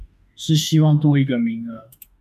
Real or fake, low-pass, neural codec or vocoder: fake; 14.4 kHz; autoencoder, 48 kHz, 32 numbers a frame, DAC-VAE, trained on Japanese speech